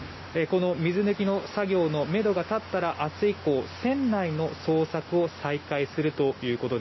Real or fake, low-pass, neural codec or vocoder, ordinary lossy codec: real; 7.2 kHz; none; MP3, 24 kbps